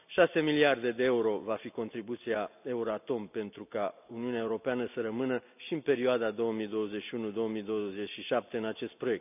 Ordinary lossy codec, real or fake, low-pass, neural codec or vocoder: none; real; 3.6 kHz; none